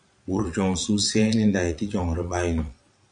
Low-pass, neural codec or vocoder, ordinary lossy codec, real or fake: 9.9 kHz; vocoder, 22.05 kHz, 80 mel bands, Vocos; MP3, 64 kbps; fake